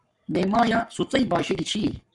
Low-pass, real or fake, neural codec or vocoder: 10.8 kHz; fake; codec, 44.1 kHz, 7.8 kbps, Pupu-Codec